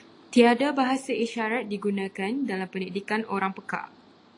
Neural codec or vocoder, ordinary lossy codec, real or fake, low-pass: none; AAC, 48 kbps; real; 10.8 kHz